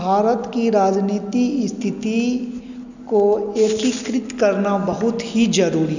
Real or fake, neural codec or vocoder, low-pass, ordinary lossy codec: real; none; 7.2 kHz; none